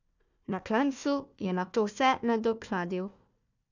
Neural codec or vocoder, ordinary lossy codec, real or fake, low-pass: codec, 16 kHz, 1 kbps, FunCodec, trained on Chinese and English, 50 frames a second; none; fake; 7.2 kHz